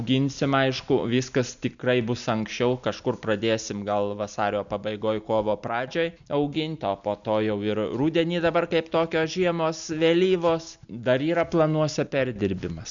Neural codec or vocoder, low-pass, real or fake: none; 7.2 kHz; real